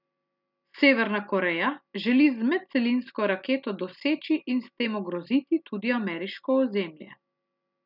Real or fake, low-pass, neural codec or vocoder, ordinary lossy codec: real; 5.4 kHz; none; none